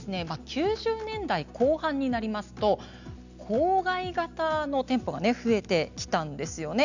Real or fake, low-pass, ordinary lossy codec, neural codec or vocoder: real; 7.2 kHz; none; none